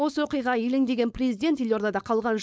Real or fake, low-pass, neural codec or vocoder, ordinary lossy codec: fake; none; codec, 16 kHz, 4.8 kbps, FACodec; none